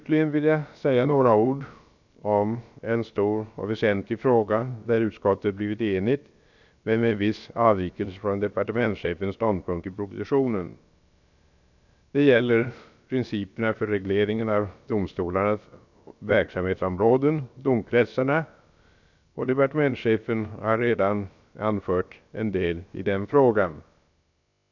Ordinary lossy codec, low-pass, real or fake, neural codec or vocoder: none; 7.2 kHz; fake; codec, 16 kHz, about 1 kbps, DyCAST, with the encoder's durations